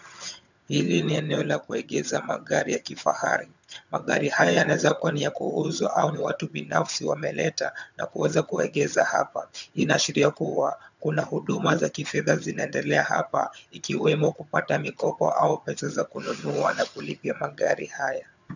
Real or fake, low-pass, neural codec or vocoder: fake; 7.2 kHz; vocoder, 22.05 kHz, 80 mel bands, HiFi-GAN